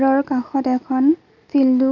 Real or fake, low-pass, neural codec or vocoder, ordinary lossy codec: real; 7.2 kHz; none; none